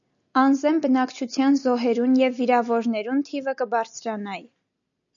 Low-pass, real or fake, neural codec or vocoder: 7.2 kHz; real; none